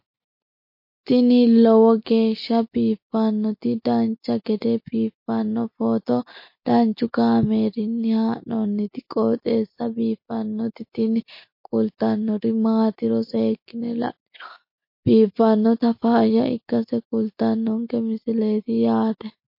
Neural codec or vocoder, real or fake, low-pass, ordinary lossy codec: none; real; 5.4 kHz; MP3, 32 kbps